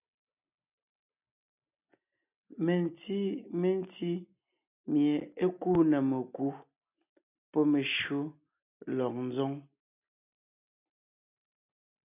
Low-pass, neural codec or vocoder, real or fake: 3.6 kHz; none; real